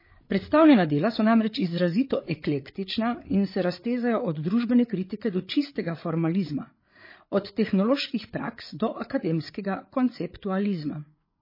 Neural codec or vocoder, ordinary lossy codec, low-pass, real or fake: codec, 16 kHz, 8 kbps, FreqCodec, larger model; MP3, 24 kbps; 5.4 kHz; fake